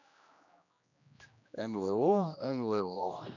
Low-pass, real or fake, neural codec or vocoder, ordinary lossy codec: 7.2 kHz; fake; codec, 16 kHz, 1 kbps, X-Codec, HuBERT features, trained on general audio; none